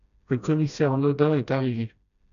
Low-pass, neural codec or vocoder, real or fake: 7.2 kHz; codec, 16 kHz, 1 kbps, FreqCodec, smaller model; fake